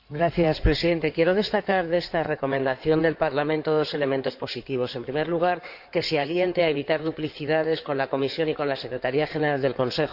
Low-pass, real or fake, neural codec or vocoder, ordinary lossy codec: 5.4 kHz; fake; codec, 16 kHz in and 24 kHz out, 2.2 kbps, FireRedTTS-2 codec; none